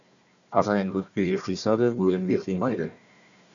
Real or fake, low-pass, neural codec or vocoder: fake; 7.2 kHz; codec, 16 kHz, 1 kbps, FunCodec, trained on Chinese and English, 50 frames a second